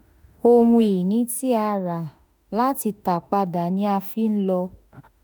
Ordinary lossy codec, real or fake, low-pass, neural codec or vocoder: none; fake; none; autoencoder, 48 kHz, 32 numbers a frame, DAC-VAE, trained on Japanese speech